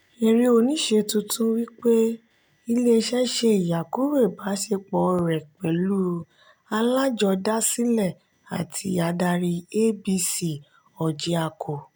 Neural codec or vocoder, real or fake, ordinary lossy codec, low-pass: none; real; none; none